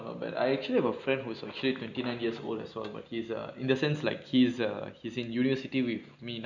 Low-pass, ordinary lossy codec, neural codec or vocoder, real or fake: 7.2 kHz; none; none; real